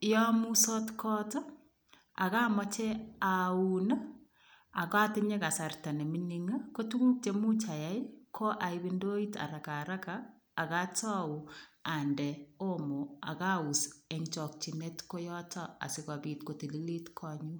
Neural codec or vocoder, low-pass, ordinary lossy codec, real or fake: none; none; none; real